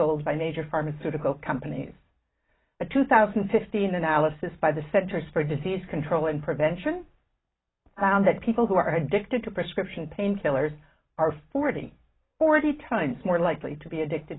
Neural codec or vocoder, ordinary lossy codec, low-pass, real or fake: none; AAC, 16 kbps; 7.2 kHz; real